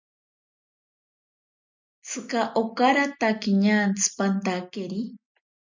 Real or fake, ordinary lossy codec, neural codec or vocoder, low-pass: real; MP3, 64 kbps; none; 7.2 kHz